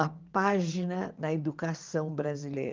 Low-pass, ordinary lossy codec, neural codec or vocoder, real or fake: 7.2 kHz; Opus, 32 kbps; vocoder, 22.05 kHz, 80 mel bands, WaveNeXt; fake